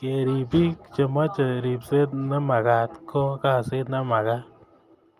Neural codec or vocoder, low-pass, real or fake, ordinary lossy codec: none; 14.4 kHz; real; Opus, 32 kbps